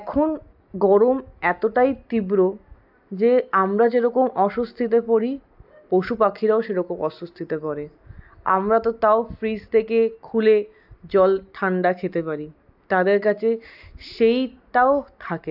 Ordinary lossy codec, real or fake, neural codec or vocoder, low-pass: AAC, 48 kbps; real; none; 5.4 kHz